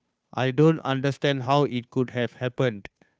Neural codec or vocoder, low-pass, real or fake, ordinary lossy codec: codec, 16 kHz, 2 kbps, FunCodec, trained on Chinese and English, 25 frames a second; none; fake; none